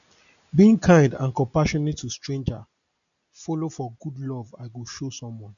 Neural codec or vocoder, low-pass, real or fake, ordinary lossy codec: none; 7.2 kHz; real; none